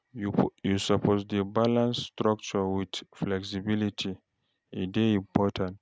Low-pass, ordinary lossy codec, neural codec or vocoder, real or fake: none; none; none; real